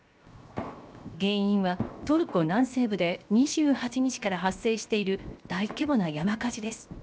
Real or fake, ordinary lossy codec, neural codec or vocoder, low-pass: fake; none; codec, 16 kHz, 0.7 kbps, FocalCodec; none